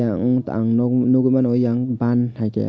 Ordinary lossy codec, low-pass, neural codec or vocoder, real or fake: none; none; none; real